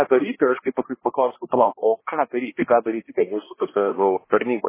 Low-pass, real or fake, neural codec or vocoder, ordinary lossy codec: 3.6 kHz; fake; codec, 16 kHz, 1 kbps, X-Codec, HuBERT features, trained on balanced general audio; MP3, 16 kbps